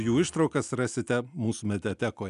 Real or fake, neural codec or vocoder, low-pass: real; none; 10.8 kHz